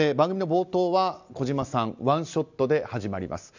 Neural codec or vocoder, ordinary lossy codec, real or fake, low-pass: none; none; real; 7.2 kHz